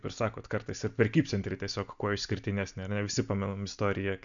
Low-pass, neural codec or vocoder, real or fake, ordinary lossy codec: 7.2 kHz; none; real; MP3, 96 kbps